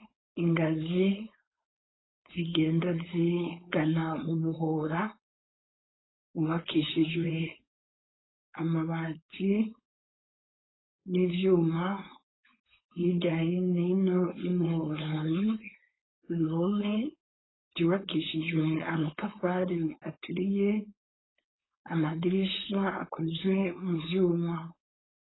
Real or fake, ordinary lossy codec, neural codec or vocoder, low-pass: fake; AAC, 16 kbps; codec, 16 kHz, 4.8 kbps, FACodec; 7.2 kHz